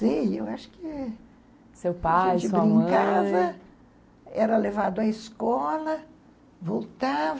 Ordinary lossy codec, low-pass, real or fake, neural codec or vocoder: none; none; real; none